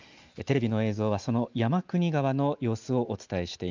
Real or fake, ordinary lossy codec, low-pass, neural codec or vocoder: real; Opus, 32 kbps; 7.2 kHz; none